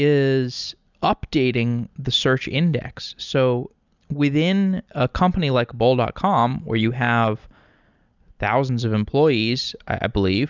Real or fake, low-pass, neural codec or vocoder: real; 7.2 kHz; none